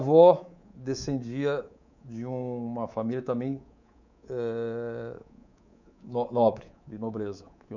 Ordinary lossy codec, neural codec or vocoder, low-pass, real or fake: none; codec, 24 kHz, 3.1 kbps, DualCodec; 7.2 kHz; fake